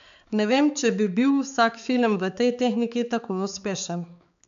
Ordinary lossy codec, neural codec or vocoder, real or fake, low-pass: MP3, 64 kbps; codec, 16 kHz, 4 kbps, X-Codec, HuBERT features, trained on balanced general audio; fake; 7.2 kHz